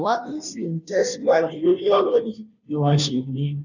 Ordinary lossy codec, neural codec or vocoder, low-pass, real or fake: none; codec, 16 kHz, 0.5 kbps, FunCodec, trained on Chinese and English, 25 frames a second; 7.2 kHz; fake